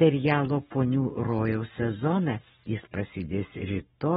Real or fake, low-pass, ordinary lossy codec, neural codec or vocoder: fake; 19.8 kHz; AAC, 16 kbps; codec, 44.1 kHz, 7.8 kbps, Pupu-Codec